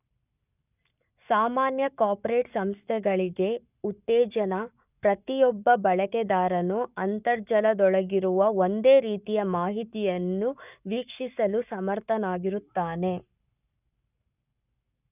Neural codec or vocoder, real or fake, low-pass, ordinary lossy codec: codec, 44.1 kHz, 7.8 kbps, Pupu-Codec; fake; 3.6 kHz; none